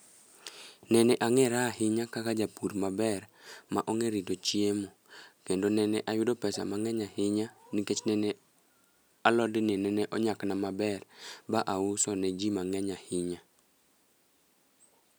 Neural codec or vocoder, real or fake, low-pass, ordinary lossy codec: none; real; none; none